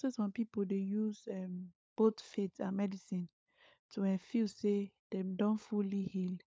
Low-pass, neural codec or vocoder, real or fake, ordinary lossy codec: none; codec, 16 kHz, 16 kbps, FunCodec, trained on LibriTTS, 50 frames a second; fake; none